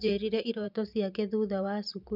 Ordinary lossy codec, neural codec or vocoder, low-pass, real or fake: none; vocoder, 44.1 kHz, 128 mel bands every 512 samples, BigVGAN v2; 5.4 kHz; fake